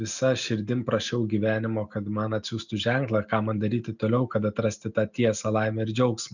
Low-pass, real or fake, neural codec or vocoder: 7.2 kHz; real; none